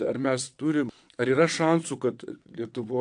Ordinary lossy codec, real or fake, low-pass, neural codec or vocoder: AAC, 64 kbps; fake; 9.9 kHz; vocoder, 22.05 kHz, 80 mel bands, WaveNeXt